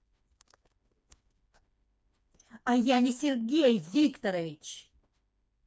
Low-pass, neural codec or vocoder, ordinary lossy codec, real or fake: none; codec, 16 kHz, 2 kbps, FreqCodec, smaller model; none; fake